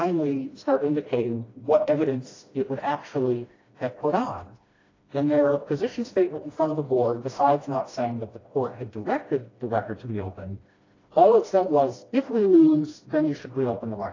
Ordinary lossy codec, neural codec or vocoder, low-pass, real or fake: AAC, 32 kbps; codec, 16 kHz, 1 kbps, FreqCodec, smaller model; 7.2 kHz; fake